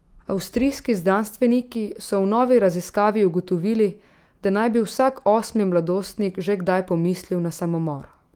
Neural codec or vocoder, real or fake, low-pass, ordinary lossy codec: autoencoder, 48 kHz, 128 numbers a frame, DAC-VAE, trained on Japanese speech; fake; 19.8 kHz; Opus, 24 kbps